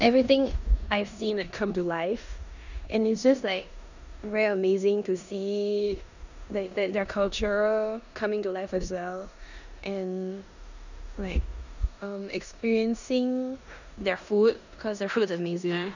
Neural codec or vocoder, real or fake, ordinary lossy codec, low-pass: codec, 16 kHz in and 24 kHz out, 0.9 kbps, LongCat-Audio-Codec, four codebook decoder; fake; none; 7.2 kHz